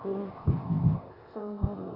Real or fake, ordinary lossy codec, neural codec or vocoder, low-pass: fake; Opus, 64 kbps; codec, 16 kHz, 0.8 kbps, ZipCodec; 5.4 kHz